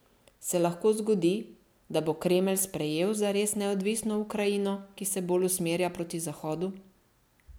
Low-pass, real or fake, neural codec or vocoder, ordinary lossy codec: none; real; none; none